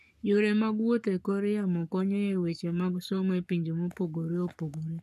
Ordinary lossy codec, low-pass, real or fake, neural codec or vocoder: none; 14.4 kHz; fake; codec, 44.1 kHz, 7.8 kbps, Pupu-Codec